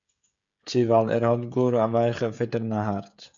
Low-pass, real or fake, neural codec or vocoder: 7.2 kHz; fake; codec, 16 kHz, 16 kbps, FreqCodec, smaller model